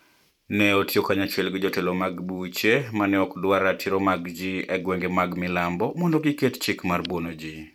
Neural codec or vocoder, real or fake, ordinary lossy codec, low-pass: vocoder, 44.1 kHz, 128 mel bands every 512 samples, BigVGAN v2; fake; none; 19.8 kHz